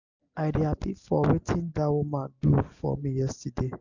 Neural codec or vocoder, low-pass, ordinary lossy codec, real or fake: none; 7.2 kHz; none; real